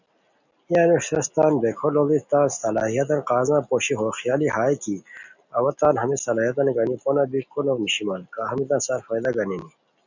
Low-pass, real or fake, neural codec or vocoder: 7.2 kHz; real; none